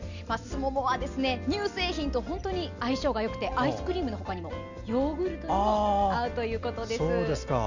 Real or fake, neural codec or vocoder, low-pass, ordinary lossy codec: real; none; 7.2 kHz; none